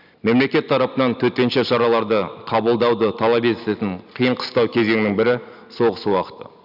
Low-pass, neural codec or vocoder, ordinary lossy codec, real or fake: 5.4 kHz; none; none; real